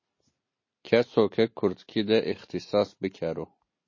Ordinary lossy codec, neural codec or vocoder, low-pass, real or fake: MP3, 32 kbps; none; 7.2 kHz; real